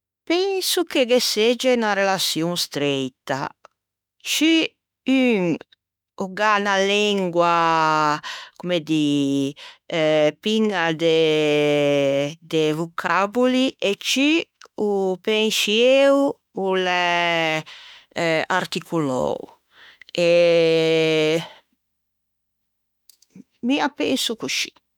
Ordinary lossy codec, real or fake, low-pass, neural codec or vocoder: none; fake; 19.8 kHz; autoencoder, 48 kHz, 32 numbers a frame, DAC-VAE, trained on Japanese speech